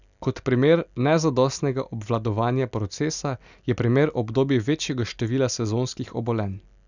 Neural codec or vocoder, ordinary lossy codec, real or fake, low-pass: none; none; real; 7.2 kHz